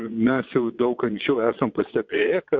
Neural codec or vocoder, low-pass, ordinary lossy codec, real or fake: vocoder, 22.05 kHz, 80 mel bands, WaveNeXt; 7.2 kHz; MP3, 48 kbps; fake